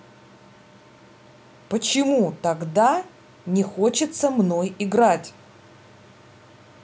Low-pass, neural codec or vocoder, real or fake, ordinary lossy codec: none; none; real; none